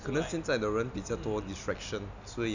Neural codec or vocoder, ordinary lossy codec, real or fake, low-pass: none; none; real; 7.2 kHz